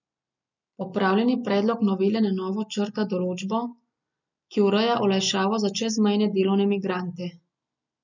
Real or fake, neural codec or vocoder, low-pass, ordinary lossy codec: real; none; 7.2 kHz; none